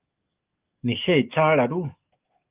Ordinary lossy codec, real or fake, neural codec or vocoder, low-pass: Opus, 24 kbps; fake; codec, 44.1 kHz, 7.8 kbps, DAC; 3.6 kHz